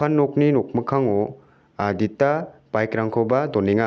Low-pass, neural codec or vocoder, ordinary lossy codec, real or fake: none; none; none; real